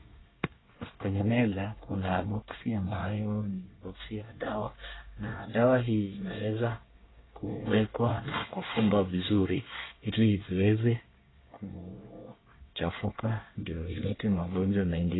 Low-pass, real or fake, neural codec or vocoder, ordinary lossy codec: 7.2 kHz; fake; codec, 24 kHz, 1 kbps, SNAC; AAC, 16 kbps